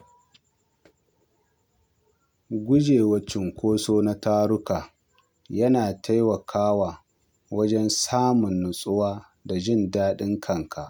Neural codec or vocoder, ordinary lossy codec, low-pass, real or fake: none; none; none; real